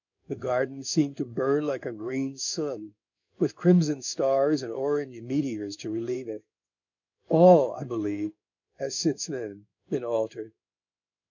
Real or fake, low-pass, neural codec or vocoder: fake; 7.2 kHz; codec, 16 kHz in and 24 kHz out, 1 kbps, XY-Tokenizer